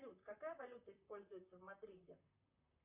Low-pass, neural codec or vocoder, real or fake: 3.6 kHz; vocoder, 44.1 kHz, 128 mel bands, Pupu-Vocoder; fake